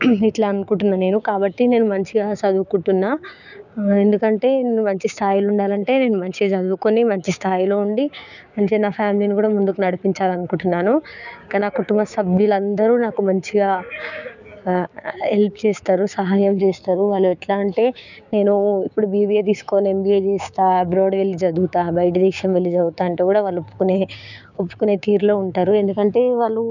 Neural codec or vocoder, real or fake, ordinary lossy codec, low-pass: codec, 16 kHz, 6 kbps, DAC; fake; none; 7.2 kHz